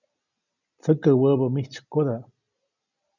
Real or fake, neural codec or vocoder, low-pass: real; none; 7.2 kHz